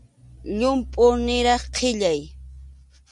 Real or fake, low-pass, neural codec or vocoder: real; 10.8 kHz; none